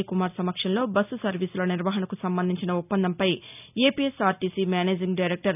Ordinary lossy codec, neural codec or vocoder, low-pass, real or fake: none; none; 3.6 kHz; real